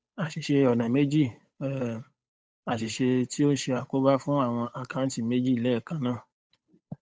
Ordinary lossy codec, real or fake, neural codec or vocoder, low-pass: none; fake; codec, 16 kHz, 8 kbps, FunCodec, trained on Chinese and English, 25 frames a second; none